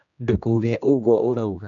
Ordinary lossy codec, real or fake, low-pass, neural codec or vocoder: none; fake; 7.2 kHz; codec, 16 kHz, 1 kbps, X-Codec, HuBERT features, trained on general audio